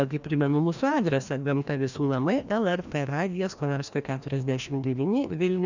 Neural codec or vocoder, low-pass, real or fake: codec, 16 kHz, 1 kbps, FreqCodec, larger model; 7.2 kHz; fake